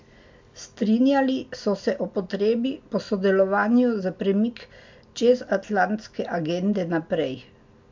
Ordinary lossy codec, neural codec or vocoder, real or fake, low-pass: MP3, 64 kbps; none; real; 7.2 kHz